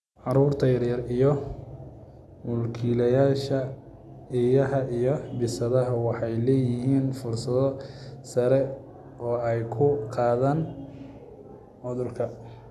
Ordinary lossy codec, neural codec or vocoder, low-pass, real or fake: none; none; none; real